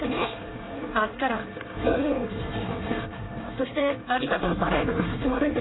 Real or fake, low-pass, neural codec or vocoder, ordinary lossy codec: fake; 7.2 kHz; codec, 24 kHz, 1 kbps, SNAC; AAC, 16 kbps